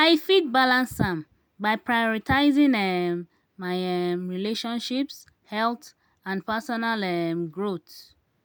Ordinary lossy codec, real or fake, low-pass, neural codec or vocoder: none; real; none; none